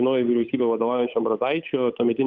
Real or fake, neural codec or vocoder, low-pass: fake; codec, 16 kHz, 8 kbps, FunCodec, trained on Chinese and English, 25 frames a second; 7.2 kHz